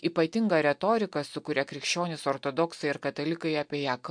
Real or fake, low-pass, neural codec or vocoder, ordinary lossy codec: real; 9.9 kHz; none; MP3, 64 kbps